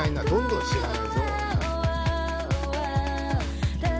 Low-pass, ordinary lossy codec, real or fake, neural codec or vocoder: none; none; real; none